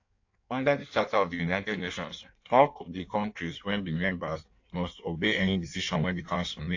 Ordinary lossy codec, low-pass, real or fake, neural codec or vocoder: AAC, 48 kbps; 7.2 kHz; fake; codec, 16 kHz in and 24 kHz out, 1.1 kbps, FireRedTTS-2 codec